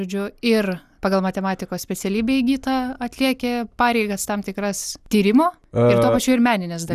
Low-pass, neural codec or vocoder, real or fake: 14.4 kHz; none; real